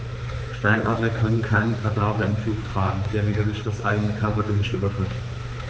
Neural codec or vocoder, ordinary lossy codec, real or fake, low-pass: codec, 16 kHz, 4 kbps, X-Codec, HuBERT features, trained on balanced general audio; none; fake; none